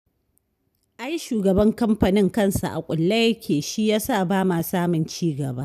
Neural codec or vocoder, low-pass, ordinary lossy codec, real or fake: none; 14.4 kHz; none; real